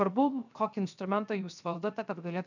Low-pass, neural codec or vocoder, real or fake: 7.2 kHz; codec, 16 kHz, 0.7 kbps, FocalCodec; fake